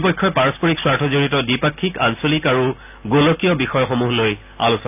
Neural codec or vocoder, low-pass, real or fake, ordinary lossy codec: none; 3.6 kHz; real; none